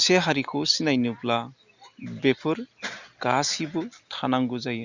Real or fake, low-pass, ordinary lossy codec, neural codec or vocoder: real; 7.2 kHz; Opus, 64 kbps; none